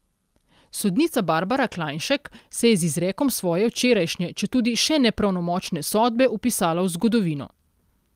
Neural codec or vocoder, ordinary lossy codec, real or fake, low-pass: none; Opus, 32 kbps; real; 14.4 kHz